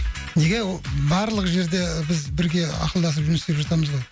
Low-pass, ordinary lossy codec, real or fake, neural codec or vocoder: none; none; real; none